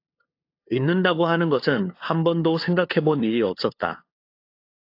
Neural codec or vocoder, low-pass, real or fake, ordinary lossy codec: codec, 16 kHz, 2 kbps, FunCodec, trained on LibriTTS, 25 frames a second; 5.4 kHz; fake; AAC, 32 kbps